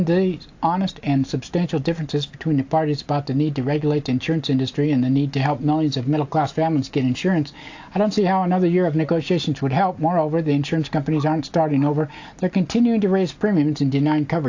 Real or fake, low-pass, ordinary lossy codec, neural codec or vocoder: real; 7.2 kHz; AAC, 48 kbps; none